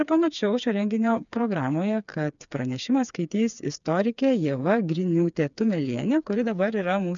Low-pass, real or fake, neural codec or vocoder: 7.2 kHz; fake; codec, 16 kHz, 4 kbps, FreqCodec, smaller model